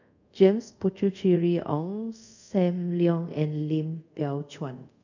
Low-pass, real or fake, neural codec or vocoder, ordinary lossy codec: 7.2 kHz; fake; codec, 24 kHz, 0.5 kbps, DualCodec; none